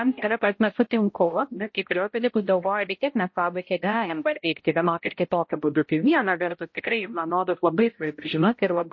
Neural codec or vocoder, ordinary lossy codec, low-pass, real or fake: codec, 16 kHz, 0.5 kbps, X-Codec, HuBERT features, trained on balanced general audio; MP3, 32 kbps; 7.2 kHz; fake